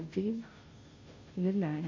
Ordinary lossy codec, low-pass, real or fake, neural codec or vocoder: MP3, 64 kbps; 7.2 kHz; fake; codec, 16 kHz, 0.5 kbps, FunCodec, trained on Chinese and English, 25 frames a second